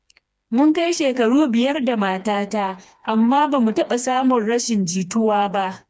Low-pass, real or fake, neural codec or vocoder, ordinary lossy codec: none; fake; codec, 16 kHz, 2 kbps, FreqCodec, smaller model; none